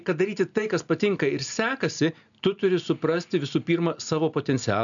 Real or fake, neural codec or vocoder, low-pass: real; none; 7.2 kHz